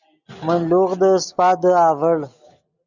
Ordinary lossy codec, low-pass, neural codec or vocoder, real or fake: Opus, 64 kbps; 7.2 kHz; none; real